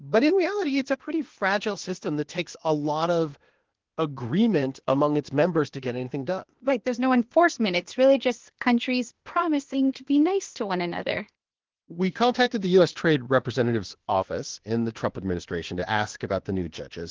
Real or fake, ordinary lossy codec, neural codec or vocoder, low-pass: fake; Opus, 16 kbps; codec, 16 kHz, 0.8 kbps, ZipCodec; 7.2 kHz